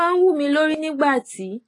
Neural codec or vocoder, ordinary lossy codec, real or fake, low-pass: none; AAC, 32 kbps; real; 10.8 kHz